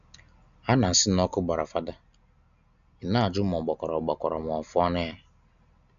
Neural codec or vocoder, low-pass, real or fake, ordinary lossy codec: none; 7.2 kHz; real; none